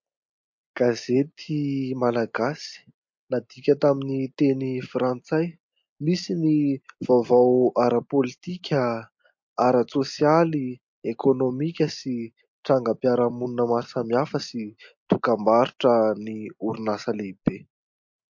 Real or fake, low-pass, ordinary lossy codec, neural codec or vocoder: real; 7.2 kHz; MP3, 48 kbps; none